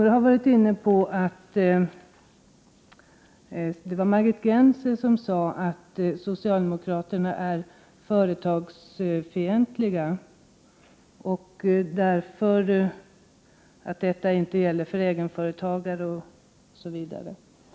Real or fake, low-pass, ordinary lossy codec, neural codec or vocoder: real; none; none; none